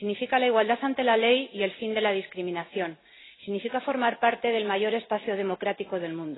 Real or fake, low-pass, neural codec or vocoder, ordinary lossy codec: real; 7.2 kHz; none; AAC, 16 kbps